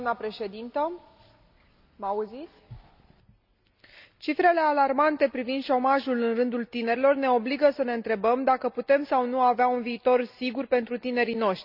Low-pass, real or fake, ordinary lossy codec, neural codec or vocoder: 5.4 kHz; real; none; none